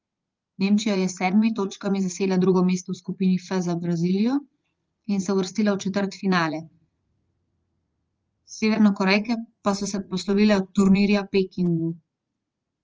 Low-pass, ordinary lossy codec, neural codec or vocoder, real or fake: 7.2 kHz; Opus, 24 kbps; vocoder, 44.1 kHz, 80 mel bands, Vocos; fake